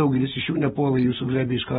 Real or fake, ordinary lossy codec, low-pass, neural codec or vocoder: real; AAC, 16 kbps; 19.8 kHz; none